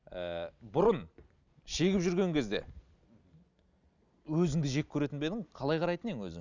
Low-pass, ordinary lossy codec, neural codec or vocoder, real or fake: 7.2 kHz; none; none; real